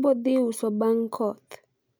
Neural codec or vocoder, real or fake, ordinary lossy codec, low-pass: none; real; none; none